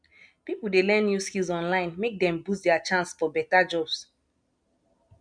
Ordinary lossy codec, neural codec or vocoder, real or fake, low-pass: MP3, 96 kbps; none; real; 9.9 kHz